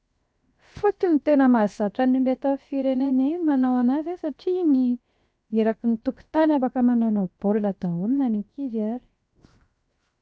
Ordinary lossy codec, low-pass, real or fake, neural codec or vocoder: none; none; fake; codec, 16 kHz, 0.7 kbps, FocalCodec